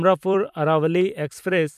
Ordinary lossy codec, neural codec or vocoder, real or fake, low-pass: none; vocoder, 44.1 kHz, 128 mel bands every 256 samples, BigVGAN v2; fake; 14.4 kHz